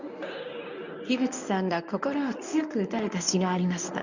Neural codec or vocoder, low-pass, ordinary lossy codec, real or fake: codec, 24 kHz, 0.9 kbps, WavTokenizer, medium speech release version 1; 7.2 kHz; none; fake